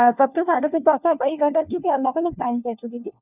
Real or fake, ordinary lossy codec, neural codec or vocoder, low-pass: fake; none; codec, 16 kHz, 1 kbps, FunCodec, trained on LibriTTS, 50 frames a second; 3.6 kHz